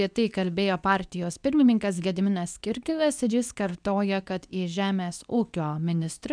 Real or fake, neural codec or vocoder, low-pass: fake; codec, 24 kHz, 0.9 kbps, WavTokenizer, medium speech release version 2; 9.9 kHz